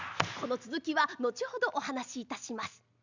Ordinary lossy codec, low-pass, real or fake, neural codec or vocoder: Opus, 64 kbps; 7.2 kHz; real; none